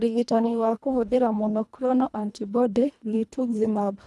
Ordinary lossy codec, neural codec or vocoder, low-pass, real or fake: none; codec, 24 kHz, 1.5 kbps, HILCodec; none; fake